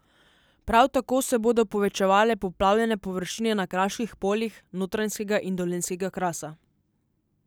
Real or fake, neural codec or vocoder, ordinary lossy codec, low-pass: real; none; none; none